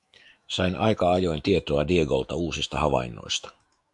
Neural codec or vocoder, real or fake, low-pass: autoencoder, 48 kHz, 128 numbers a frame, DAC-VAE, trained on Japanese speech; fake; 10.8 kHz